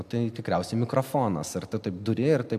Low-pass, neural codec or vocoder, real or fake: 14.4 kHz; none; real